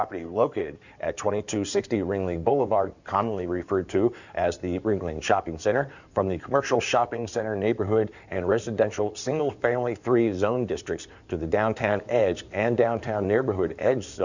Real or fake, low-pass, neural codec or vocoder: fake; 7.2 kHz; codec, 16 kHz in and 24 kHz out, 2.2 kbps, FireRedTTS-2 codec